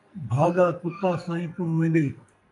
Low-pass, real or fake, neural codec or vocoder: 10.8 kHz; fake; codec, 44.1 kHz, 2.6 kbps, SNAC